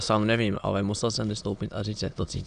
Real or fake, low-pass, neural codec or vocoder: fake; 9.9 kHz; autoencoder, 22.05 kHz, a latent of 192 numbers a frame, VITS, trained on many speakers